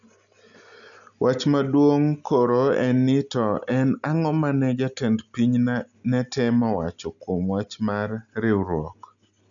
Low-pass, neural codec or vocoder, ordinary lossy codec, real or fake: 7.2 kHz; none; none; real